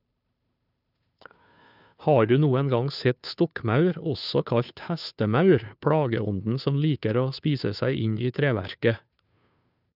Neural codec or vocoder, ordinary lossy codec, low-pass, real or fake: codec, 16 kHz, 2 kbps, FunCodec, trained on Chinese and English, 25 frames a second; none; 5.4 kHz; fake